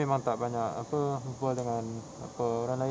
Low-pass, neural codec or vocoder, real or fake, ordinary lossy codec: none; none; real; none